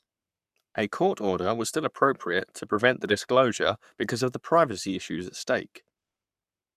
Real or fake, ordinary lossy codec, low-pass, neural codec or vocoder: fake; none; 14.4 kHz; codec, 44.1 kHz, 7.8 kbps, Pupu-Codec